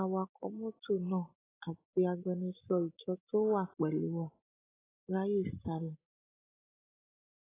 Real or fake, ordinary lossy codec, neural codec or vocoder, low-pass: real; AAC, 16 kbps; none; 3.6 kHz